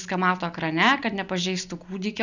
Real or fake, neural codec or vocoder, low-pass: real; none; 7.2 kHz